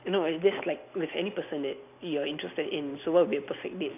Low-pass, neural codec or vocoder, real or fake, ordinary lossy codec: 3.6 kHz; none; real; none